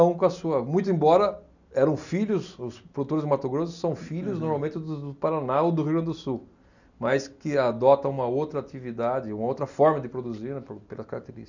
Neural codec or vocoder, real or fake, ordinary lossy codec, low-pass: none; real; none; 7.2 kHz